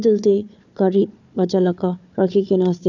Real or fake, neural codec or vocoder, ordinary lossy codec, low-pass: fake; codec, 16 kHz, 4 kbps, FunCodec, trained on Chinese and English, 50 frames a second; none; 7.2 kHz